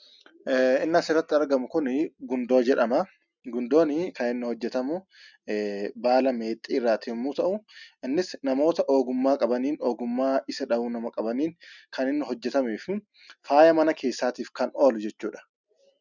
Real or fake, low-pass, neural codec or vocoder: real; 7.2 kHz; none